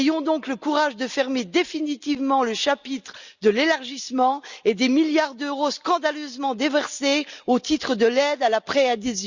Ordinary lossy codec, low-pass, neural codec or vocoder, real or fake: Opus, 64 kbps; 7.2 kHz; none; real